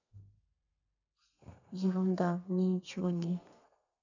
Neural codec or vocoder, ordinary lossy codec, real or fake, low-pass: codec, 32 kHz, 1.9 kbps, SNAC; none; fake; 7.2 kHz